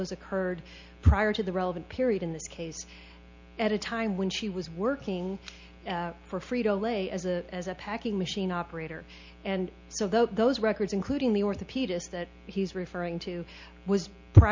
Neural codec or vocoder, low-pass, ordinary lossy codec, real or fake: none; 7.2 kHz; MP3, 48 kbps; real